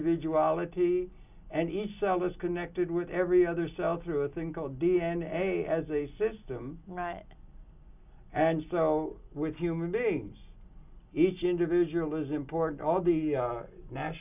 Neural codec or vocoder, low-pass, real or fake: none; 3.6 kHz; real